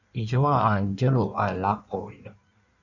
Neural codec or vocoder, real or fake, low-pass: codec, 16 kHz in and 24 kHz out, 1.1 kbps, FireRedTTS-2 codec; fake; 7.2 kHz